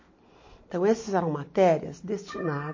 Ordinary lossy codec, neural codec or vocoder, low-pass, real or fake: MP3, 32 kbps; none; 7.2 kHz; real